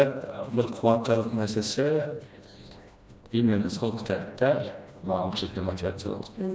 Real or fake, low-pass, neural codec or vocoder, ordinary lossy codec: fake; none; codec, 16 kHz, 1 kbps, FreqCodec, smaller model; none